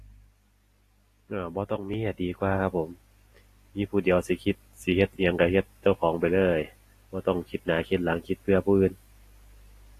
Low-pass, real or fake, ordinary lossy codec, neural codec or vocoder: 14.4 kHz; real; AAC, 48 kbps; none